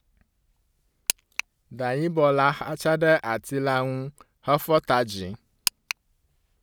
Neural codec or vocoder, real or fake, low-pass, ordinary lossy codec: none; real; none; none